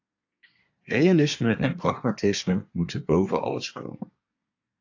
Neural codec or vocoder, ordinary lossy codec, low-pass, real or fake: codec, 24 kHz, 1 kbps, SNAC; AAC, 48 kbps; 7.2 kHz; fake